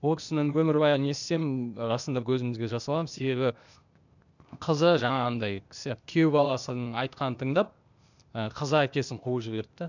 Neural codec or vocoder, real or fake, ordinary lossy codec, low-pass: codec, 16 kHz, 0.8 kbps, ZipCodec; fake; none; 7.2 kHz